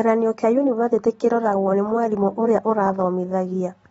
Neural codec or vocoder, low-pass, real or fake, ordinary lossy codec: none; 19.8 kHz; real; AAC, 24 kbps